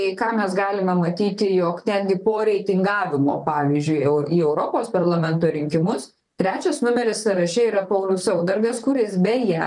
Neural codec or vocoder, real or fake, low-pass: vocoder, 44.1 kHz, 128 mel bands, Pupu-Vocoder; fake; 10.8 kHz